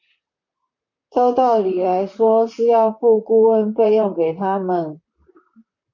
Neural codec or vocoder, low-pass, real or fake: vocoder, 44.1 kHz, 128 mel bands, Pupu-Vocoder; 7.2 kHz; fake